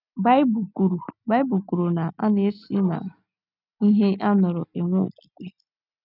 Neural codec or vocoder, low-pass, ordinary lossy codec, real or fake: none; 5.4 kHz; none; real